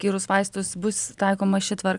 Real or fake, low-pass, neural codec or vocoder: fake; 10.8 kHz; vocoder, 44.1 kHz, 128 mel bands every 256 samples, BigVGAN v2